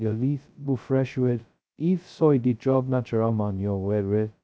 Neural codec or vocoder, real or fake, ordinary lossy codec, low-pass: codec, 16 kHz, 0.2 kbps, FocalCodec; fake; none; none